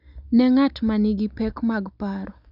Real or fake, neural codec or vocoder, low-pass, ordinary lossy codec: real; none; 5.4 kHz; none